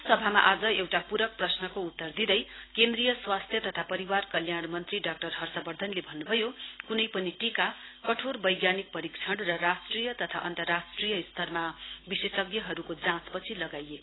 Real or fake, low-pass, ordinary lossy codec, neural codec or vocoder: real; 7.2 kHz; AAC, 16 kbps; none